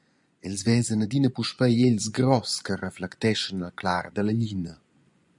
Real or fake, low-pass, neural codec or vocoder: fake; 10.8 kHz; vocoder, 24 kHz, 100 mel bands, Vocos